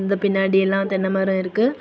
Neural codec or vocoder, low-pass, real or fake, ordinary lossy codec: none; none; real; none